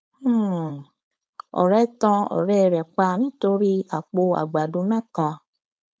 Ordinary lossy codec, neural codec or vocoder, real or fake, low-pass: none; codec, 16 kHz, 4.8 kbps, FACodec; fake; none